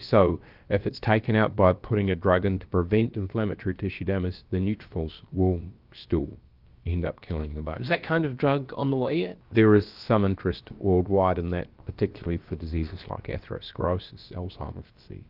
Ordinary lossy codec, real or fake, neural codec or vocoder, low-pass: Opus, 32 kbps; fake; codec, 16 kHz, about 1 kbps, DyCAST, with the encoder's durations; 5.4 kHz